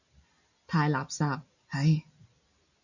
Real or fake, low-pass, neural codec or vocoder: real; 7.2 kHz; none